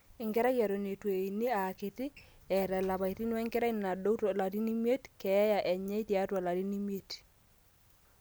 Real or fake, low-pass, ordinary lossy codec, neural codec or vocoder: real; none; none; none